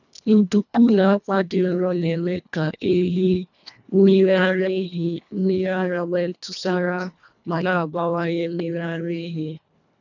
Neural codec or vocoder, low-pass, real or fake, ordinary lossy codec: codec, 24 kHz, 1.5 kbps, HILCodec; 7.2 kHz; fake; none